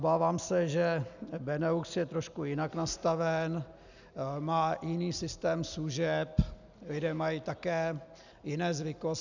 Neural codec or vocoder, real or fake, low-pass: none; real; 7.2 kHz